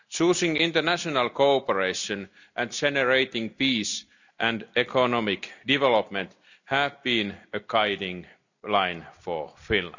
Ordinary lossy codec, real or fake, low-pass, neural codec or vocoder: none; real; 7.2 kHz; none